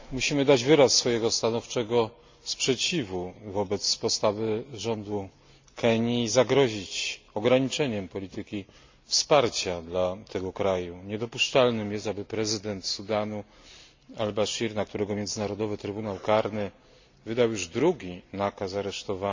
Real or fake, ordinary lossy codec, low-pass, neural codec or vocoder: real; MP3, 64 kbps; 7.2 kHz; none